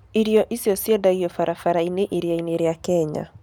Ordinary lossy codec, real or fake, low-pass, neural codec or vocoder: none; real; 19.8 kHz; none